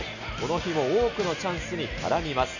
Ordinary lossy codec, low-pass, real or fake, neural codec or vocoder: none; 7.2 kHz; real; none